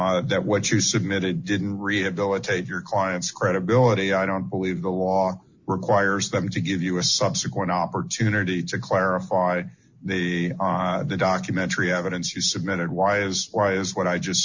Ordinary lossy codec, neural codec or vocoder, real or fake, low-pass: Opus, 64 kbps; none; real; 7.2 kHz